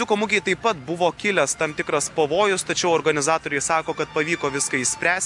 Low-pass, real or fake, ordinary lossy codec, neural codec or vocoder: 10.8 kHz; real; MP3, 96 kbps; none